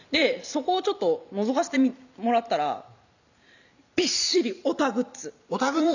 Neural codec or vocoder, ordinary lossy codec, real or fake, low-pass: vocoder, 44.1 kHz, 128 mel bands every 256 samples, BigVGAN v2; none; fake; 7.2 kHz